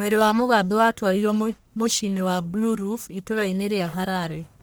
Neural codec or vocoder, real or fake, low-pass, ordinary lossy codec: codec, 44.1 kHz, 1.7 kbps, Pupu-Codec; fake; none; none